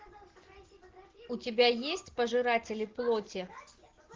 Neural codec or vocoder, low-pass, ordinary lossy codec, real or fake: none; 7.2 kHz; Opus, 16 kbps; real